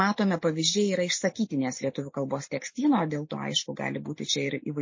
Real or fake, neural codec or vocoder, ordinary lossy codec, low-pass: real; none; MP3, 32 kbps; 7.2 kHz